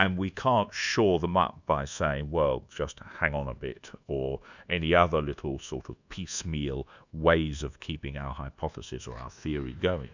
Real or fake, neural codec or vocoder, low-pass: fake; codec, 24 kHz, 1.2 kbps, DualCodec; 7.2 kHz